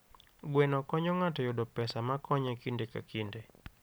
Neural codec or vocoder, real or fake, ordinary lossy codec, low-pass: none; real; none; none